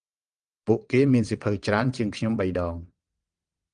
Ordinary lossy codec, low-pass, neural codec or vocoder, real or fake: Opus, 32 kbps; 9.9 kHz; vocoder, 22.05 kHz, 80 mel bands, WaveNeXt; fake